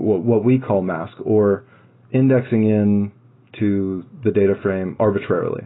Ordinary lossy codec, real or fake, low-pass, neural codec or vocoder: AAC, 16 kbps; real; 7.2 kHz; none